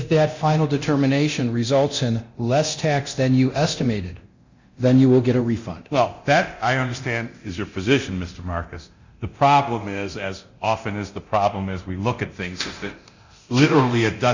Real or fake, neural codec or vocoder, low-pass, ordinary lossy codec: fake; codec, 24 kHz, 0.9 kbps, DualCodec; 7.2 kHz; Opus, 64 kbps